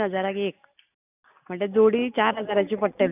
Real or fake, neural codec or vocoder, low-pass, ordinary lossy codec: real; none; 3.6 kHz; none